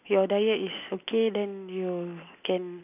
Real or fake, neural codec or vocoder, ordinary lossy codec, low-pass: real; none; none; 3.6 kHz